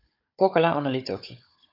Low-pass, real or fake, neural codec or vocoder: 5.4 kHz; fake; codec, 16 kHz, 16 kbps, FunCodec, trained on Chinese and English, 50 frames a second